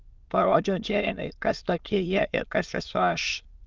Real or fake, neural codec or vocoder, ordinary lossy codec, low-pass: fake; autoencoder, 22.05 kHz, a latent of 192 numbers a frame, VITS, trained on many speakers; Opus, 24 kbps; 7.2 kHz